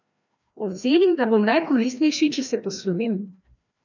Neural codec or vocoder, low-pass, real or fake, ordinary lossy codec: codec, 16 kHz, 1 kbps, FreqCodec, larger model; 7.2 kHz; fake; none